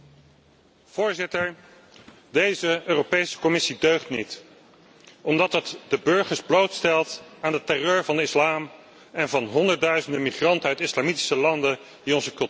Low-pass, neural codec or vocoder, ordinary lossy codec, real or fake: none; none; none; real